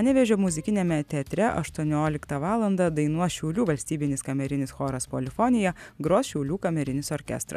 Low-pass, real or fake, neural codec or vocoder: 14.4 kHz; real; none